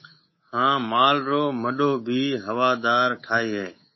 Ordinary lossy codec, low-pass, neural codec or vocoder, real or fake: MP3, 24 kbps; 7.2 kHz; codec, 24 kHz, 3.1 kbps, DualCodec; fake